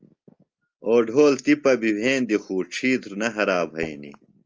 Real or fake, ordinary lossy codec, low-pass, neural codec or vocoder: real; Opus, 24 kbps; 7.2 kHz; none